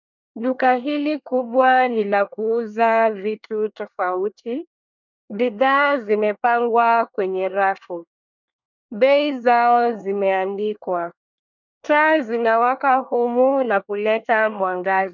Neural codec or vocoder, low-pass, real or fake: codec, 24 kHz, 1 kbps, SNAC; 7.2 kHz; fake